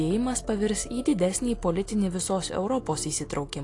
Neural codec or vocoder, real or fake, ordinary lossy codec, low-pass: none; real; AAC, 48 kbps; 10.8 kHz